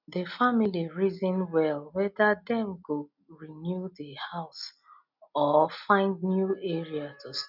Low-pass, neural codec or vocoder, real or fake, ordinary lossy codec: 5.4 kHz; none; real; none